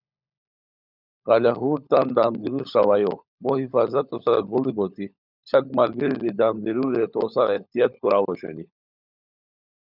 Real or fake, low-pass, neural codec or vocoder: fake; 5.4 kHz; codec, 16 kHz, 16 kbps, FunCodec, trained on LibriTTS, 50 frames a second